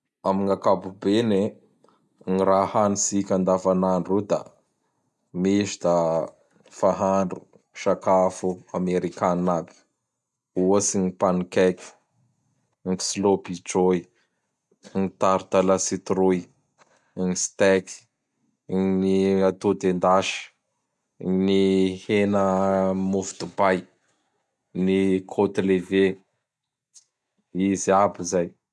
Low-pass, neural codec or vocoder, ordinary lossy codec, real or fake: none; none; none; real